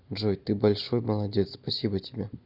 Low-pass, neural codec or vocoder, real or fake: 5.4 kHz; none; real